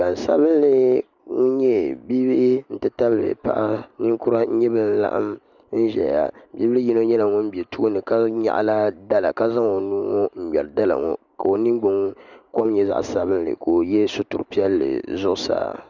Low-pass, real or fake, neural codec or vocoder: 7.2 kHz; real; none